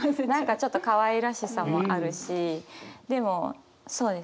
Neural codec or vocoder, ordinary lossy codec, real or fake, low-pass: none; none; real; none